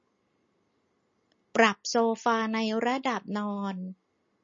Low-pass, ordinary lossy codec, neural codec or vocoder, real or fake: 7.2 kHz; MP3, 32 kbps; none; real